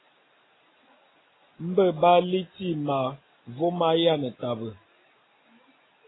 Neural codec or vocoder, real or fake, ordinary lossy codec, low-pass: none; real; AAC, 16 kbps; 7.2 kHz